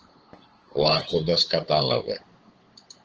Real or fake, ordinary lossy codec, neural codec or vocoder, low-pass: fake; Opus, 16 kbps; codec, 16 kHz, 8 kbps, FunCodec, trained on LibriTTS, 25 frames a second; 7.2 kHz